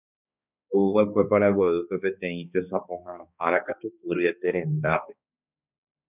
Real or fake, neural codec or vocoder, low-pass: fake; codec, 16 kHz, 2 kbps, X-Codec, HuBERT features, trained on balanced general audio; 3.6 kHz